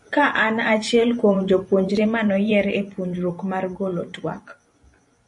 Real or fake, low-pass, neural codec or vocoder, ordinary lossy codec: fake; 10.8 kHz; vocoder, 44.1 kHz, 128 mel bands every 512 samples, BigVGAN v2; MP3, 48 kbps